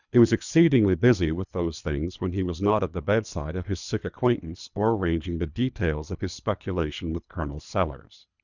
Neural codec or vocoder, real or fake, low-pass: codec, 24 kHz, 3 kbps, HILCodec; fake; 7.2 kHz